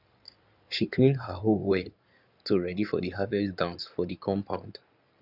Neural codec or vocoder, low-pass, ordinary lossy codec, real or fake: codec, 16 kHz in and 24 kHz out, 2.2 kbps, FireRedTTS-2 codec; 5.4 kHz; none; fake